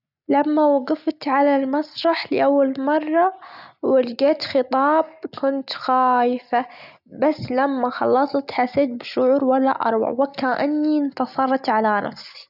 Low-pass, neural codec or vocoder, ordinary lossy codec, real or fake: 5.4 kHz; none; none; real